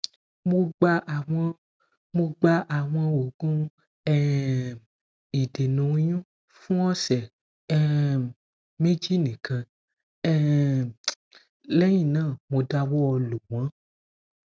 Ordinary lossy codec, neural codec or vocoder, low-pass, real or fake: none; none; none; real